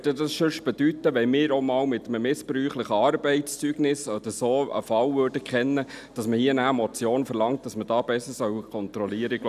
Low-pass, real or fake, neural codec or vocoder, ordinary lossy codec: 14.4 kHz; real; none; none